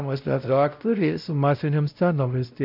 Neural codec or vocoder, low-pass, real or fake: codec, 16 kHz, 0.5 kbps, X-Codec, WavLM features, trained on Multilingual LibriSpeech; 5.4 kHz; fake